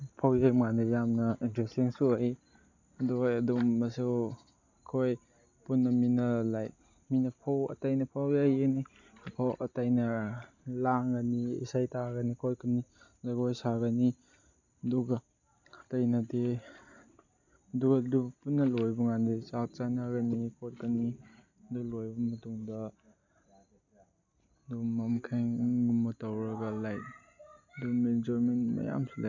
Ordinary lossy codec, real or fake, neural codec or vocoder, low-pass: none; real; none; 7.2 kHz